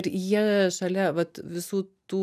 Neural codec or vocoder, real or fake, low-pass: none; real; 14.4 kHz